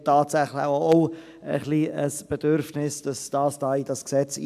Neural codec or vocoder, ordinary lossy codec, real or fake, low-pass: none; AAC, 96 kbps; real; 14.4 kHz